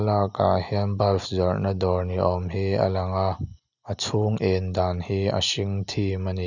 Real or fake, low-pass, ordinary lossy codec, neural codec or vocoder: real; 7.2 kHz; none; none